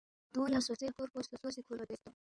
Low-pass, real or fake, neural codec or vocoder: 9.9 kHz; fake; codec, 16 kHz in and 24 kHz out, 2.2 kbps, FireRedTTS-2 codec